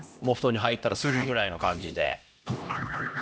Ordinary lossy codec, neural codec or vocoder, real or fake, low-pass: none; codec, 16 kHz, 1 kbps, X-Codec, HuBERT features, trained on LibriSpeech; fake; none